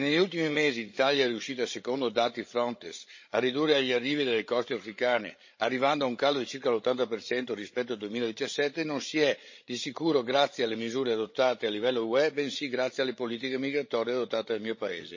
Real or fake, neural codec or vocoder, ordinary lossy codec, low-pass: fake; codec, 16 kHz, 16 kbps, FreqCodec, larger model; MP3, 32 kbps; 7.2 kHz